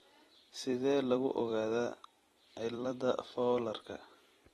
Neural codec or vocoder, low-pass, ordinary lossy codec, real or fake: vocoder, 44.1 kHz, 128 mel bands every 256 samples, BigVGAN v2; 19.8 kHz; AAC, 32 kbps; fake